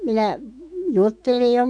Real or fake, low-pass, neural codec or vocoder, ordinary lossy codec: fake; 9.9 kHz; autoencoder, 48 kHz, 128 numbers a frame, DAC-VAE, trained on Japanese speech; none